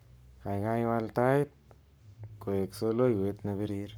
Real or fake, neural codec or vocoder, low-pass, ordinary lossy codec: fake; codec, 44.1 kHz, 7.8 kbps, Pupu-Codec; none; none